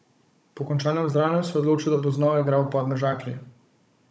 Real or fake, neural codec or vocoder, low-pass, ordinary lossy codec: fake; codec, 16 kHz, 16 kbps, FunCodec, trained on Chinese and English, 50 frames a second; none; none